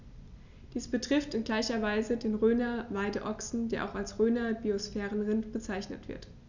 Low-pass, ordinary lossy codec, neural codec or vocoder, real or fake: 7.2 kHz; none; none; real